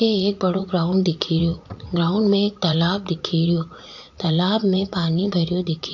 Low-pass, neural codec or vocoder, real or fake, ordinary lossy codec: 7.2 kHz; vocoder, 44.1 kHz, 80 mel bands, Vocos; fake; AAC, 48 kbps